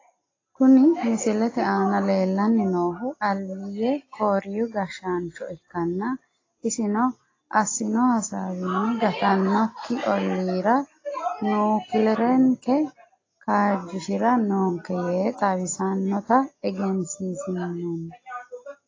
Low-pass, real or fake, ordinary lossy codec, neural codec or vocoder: 7.2 kHz; real; AAC, 32 kbps; none